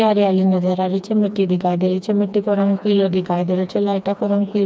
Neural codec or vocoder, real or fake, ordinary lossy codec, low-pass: codec, 16 kHz, 2 kbps, FreqCodec, smaller model; fake; none; none